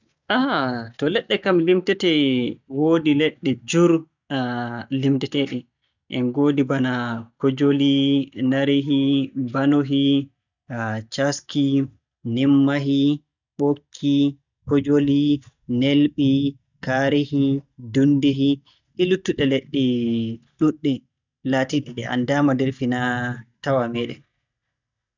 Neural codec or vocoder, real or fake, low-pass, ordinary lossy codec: none; real; 7.2 kHz; none